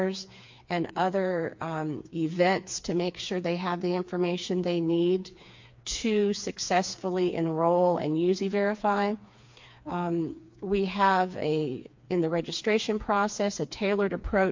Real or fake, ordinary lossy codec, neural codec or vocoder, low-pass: fake; MP3, 48 kbps; codec, 16 kHz, 4 kbps, FreqCodec, smaller model; 7.2 kHz